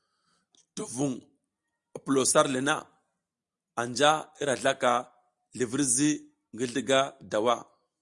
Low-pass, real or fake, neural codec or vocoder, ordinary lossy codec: 10.8 kHz; real; none; Opus, 64 kbps